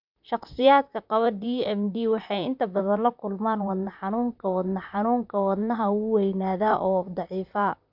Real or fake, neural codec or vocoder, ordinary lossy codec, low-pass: fake; vocoder, 44.1 kHz, 128 mel bands, Pupu-Vocoder; none; 5.4 kHz